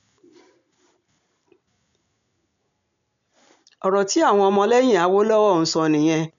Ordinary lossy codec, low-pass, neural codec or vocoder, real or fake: none; 7.2 kHz; none; real